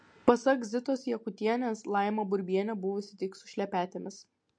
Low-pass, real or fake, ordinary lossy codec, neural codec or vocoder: 9.9 kHz; real; MP3, 48 kbps; none